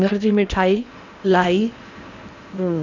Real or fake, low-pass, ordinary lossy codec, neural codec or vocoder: fake; 7.2 kHz; none; codec, 16 kHz in and 24 kHz out, 0.8 kbps, FocalCodec, streaming, 65536 codes